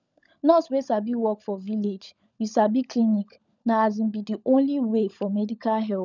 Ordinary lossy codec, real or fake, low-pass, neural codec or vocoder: none; fake; 7.2 kHz; codec, 16 kHz, 16 kbps, FunCodec, trained on LibriTTS, 50 frames a second